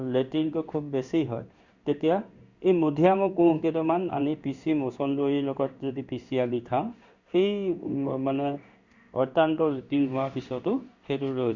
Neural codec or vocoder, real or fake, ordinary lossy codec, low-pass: codec, 16 kHz in and 24 kHz out, 1 kbps, XY-Tokenizer; fake; Opus, 64 kbps; 7.2 kHz